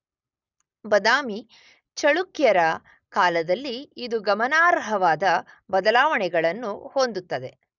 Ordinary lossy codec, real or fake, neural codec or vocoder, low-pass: none; real; none; 7.2 kHz